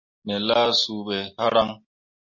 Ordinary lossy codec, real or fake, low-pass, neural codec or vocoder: MP3, 32 kbps; real; 7.2 kHz; none